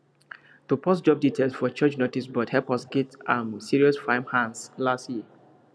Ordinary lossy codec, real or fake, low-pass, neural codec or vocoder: none; real; none; none